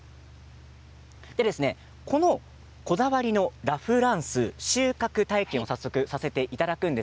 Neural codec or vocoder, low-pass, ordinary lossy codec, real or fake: none; none; none; real